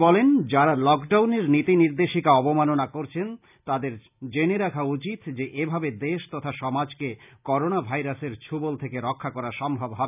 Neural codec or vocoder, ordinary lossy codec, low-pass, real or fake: none; none; 3.6 kHz; real